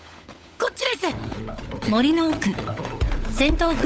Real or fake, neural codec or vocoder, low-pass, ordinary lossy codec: fake; codec, 16 kHz, 16 kbps, FunCodec, trained on LibriTTS, 50 frames a second; none; none